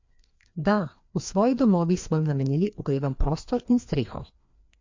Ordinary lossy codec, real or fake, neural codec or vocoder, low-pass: MP3, 48 kbps; fake; codec, 16 kHz, 2 kbps, FreqCodec, larger model; 7.2 kHz